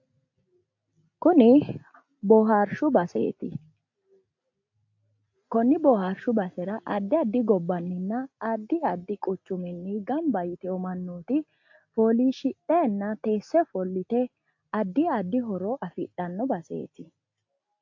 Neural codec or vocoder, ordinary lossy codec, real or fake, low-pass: none; AAC, 48 kbps; real; 7.2 kHz